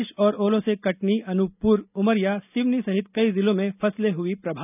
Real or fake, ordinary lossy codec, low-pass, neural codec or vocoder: real; none; 3.6 kHz; none